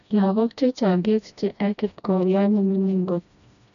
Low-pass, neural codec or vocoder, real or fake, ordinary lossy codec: 7.2 kHz; codec, 16 kHz, 1 kbps, FreqCodec, smaller model; fake; none